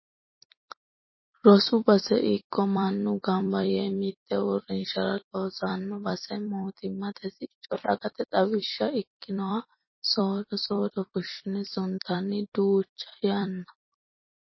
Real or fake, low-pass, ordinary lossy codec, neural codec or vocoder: fake; 7.2 kHz; MP3, 24 kbps; vocoder, 44.1 kHz, 128 mel bands every 256 samples, BigVGAN v2